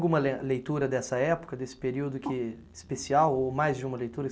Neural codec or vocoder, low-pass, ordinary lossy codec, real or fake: none; none; none; real